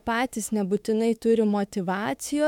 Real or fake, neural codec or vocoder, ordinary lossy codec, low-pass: fake; autoencoder, 48 kHz, 32 numbers a frame, DAC-VAE, trained on Japanese speech; MP3, 96 kbps; 19.8 kHz